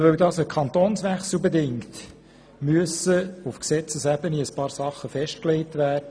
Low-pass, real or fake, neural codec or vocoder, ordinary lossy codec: none; real; none; none